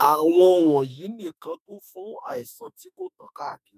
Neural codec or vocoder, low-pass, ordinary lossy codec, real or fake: autoencoder, 48 kHz, 32 numbers a frame, DAC-VAE, trained on Japanese speech; 14.4 kHz; none; fake